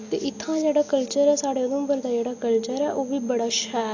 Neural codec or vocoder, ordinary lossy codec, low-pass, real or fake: none; AAC, 48 kbps; 7.2 kHz; real